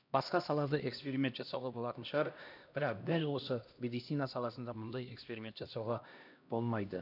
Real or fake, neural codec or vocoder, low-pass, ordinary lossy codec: fake; codec, 16 kHz, 1 kbps, X-Codec, HuBERT features, trained on LibriSpeech; 5.4 kHz; none